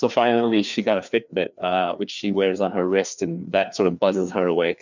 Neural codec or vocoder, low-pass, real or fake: codec, 16 kHz, 2 kbps, FreqCodec, larger model; 7.2 kHz; fake